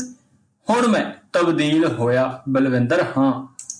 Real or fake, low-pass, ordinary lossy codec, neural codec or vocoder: real; 9.9 kHz; MP3, 96 kbps; none